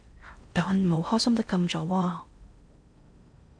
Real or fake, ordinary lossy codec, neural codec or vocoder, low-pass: fake; MP3, 64 kbps; codec, 16 kHz in and 24 kHz out, 0.6 kbps, FocalCodec, streaming, 4096 codes; 9.9 kHz